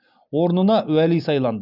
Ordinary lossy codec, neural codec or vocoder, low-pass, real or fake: none; none; 5.4 kHz; real